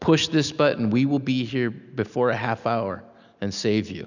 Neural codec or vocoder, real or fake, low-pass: none; real; 7.2 kHz